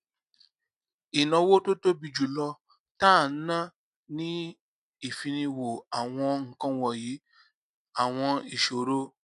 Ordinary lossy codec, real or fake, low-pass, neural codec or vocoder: none; real; 10.8 kHz; none